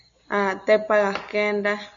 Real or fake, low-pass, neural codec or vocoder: real; 7.2 kHz; none